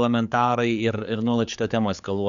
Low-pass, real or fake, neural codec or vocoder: 7.2 kHz; fake; codec, 16 kHz, 4 kbps, X-Codec, HuBERT features, trained on general audio